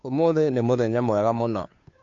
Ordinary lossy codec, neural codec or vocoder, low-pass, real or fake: AAC, 48 kbps; codec, 16 kHz, 2 kbps, FunCodec, trained on Chinese and English, 25 frames a second; 7.2 kHz; fake